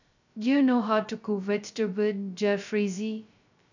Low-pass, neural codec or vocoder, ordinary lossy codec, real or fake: 7.2 kHz; codec, 16 kHz, 0.2 kbps, FocalCodec; none; fake